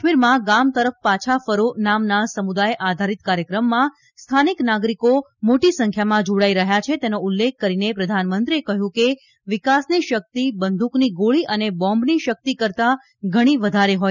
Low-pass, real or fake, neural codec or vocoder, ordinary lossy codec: 7.2 kHz; real; none; none